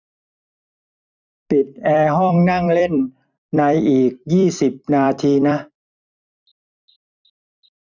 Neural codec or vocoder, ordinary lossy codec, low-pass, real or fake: vocoder, 44.1 kHz, 128 mel bands every 256 samples, BigVGAN v2; none; 7.2 kHz; fake